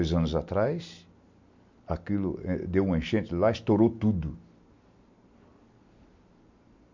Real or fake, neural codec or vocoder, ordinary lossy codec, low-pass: real; none; MP3, 64 kbps; 7.2 kHz